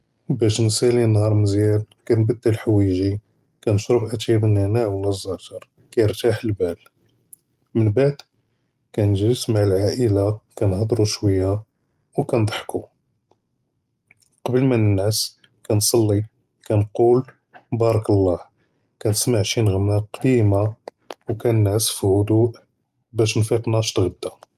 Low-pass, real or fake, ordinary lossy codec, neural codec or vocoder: 14.4 kHz; real; Opus, 32 kbps; none